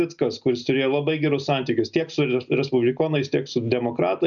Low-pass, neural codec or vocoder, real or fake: 7.2 kHz; none; real